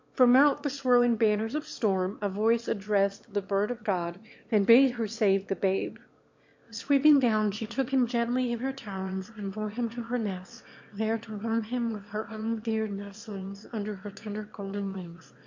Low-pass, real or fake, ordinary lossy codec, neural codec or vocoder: 7.2 kHz; fake; MP3, 48 kbps; autoencoder, 22.05 kHz, a latent of 192 numbers a frame, VITS, trained on one speaker